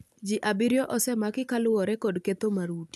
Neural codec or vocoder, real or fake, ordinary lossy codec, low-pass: none; real; none; 14.4 kHz